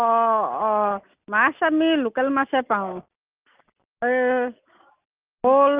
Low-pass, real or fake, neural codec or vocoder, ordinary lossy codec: 3.6 kHz; real; none; Opus, 24 kbps